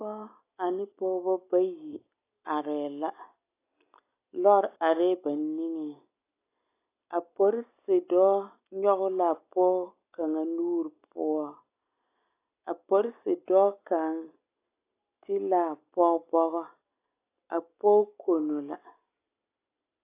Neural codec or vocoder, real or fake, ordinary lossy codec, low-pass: none; real; AAC, 24 kbps; 3.6 kHz